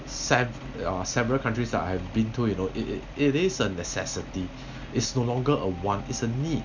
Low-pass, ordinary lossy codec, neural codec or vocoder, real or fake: 7.2 kHz; none; none; real